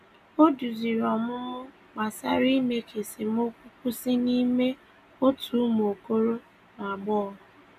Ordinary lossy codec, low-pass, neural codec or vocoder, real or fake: none; 14.4 kHz; none; real